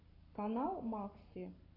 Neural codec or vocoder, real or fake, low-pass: codec, 44.1 kHz, 7.8 kbps, Pupu-Codec; fake; 5.4 kHz